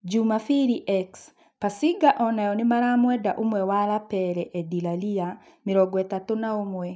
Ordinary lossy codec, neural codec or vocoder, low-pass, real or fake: none; none; none; real